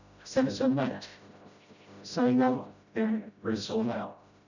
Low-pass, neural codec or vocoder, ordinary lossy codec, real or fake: 7.2 kHz; codec, 16 kHz, 0.5 kbps, FreqCodec, smaller model; none; fake